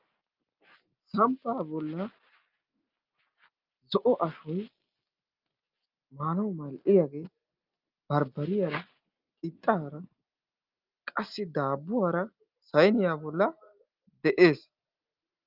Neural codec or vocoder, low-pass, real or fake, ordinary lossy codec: none; 5.4 kHz; real; Opus, 24 kbps